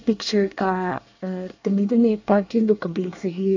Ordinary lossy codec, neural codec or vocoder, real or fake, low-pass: AAC, 48 kbps; codec, 24 kHz, 1 kbps, SNAC; fake; 7.2 kHz